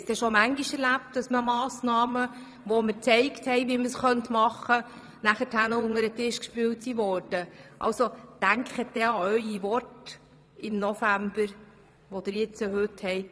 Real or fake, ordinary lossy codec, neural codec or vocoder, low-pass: fake; none; vocoder, 22.05 kHz, 80 mel bands, Vocos; none